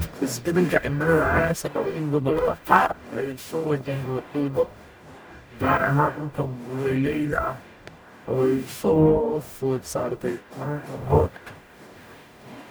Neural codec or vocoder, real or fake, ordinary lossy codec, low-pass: codec, 44.1 kHz, 0.9 kbps, DAC; fake; none; none